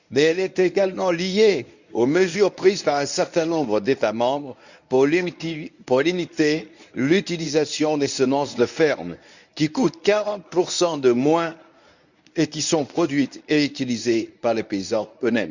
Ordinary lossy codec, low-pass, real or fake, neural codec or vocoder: none; 7.2 kHz; fake; codec, 24 kHz, 0.9 kbps, WavTokenizer, medium speech release version 1